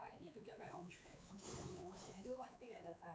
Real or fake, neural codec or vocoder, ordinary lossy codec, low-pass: fake; codec, 16 kHz, 4 kbps, X-Codec, WavLM features, trained on Multilingual LibriSpeech; none; none